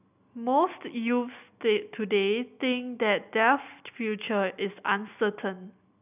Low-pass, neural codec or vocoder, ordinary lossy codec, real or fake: 3.6 kHz; none; none; real